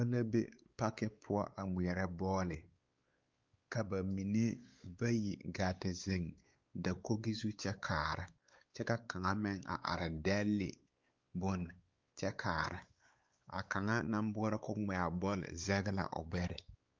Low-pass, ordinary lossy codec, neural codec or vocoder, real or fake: 7.2 kHz; Opus, 24 kbps; codec, 16 kHz, 4 kbps, X-Codec, WavLM features, trained on Multilingual LibriSpeech; fake